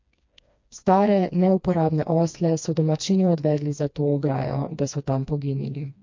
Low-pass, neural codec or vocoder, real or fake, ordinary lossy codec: 7.2 kHz; codec, 16 kHz, 2 kbps, FreqCodec, smaller model; fake; MP3, 48 kbps